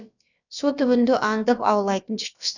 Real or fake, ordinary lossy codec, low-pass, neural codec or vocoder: fake; none; 7.2 kHz; codec, 16 kHz, about 1 kbps, DyCAST, with the encoder's durations